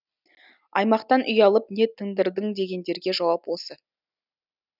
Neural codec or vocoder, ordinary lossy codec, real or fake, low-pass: none; none; real; 5.4 kHz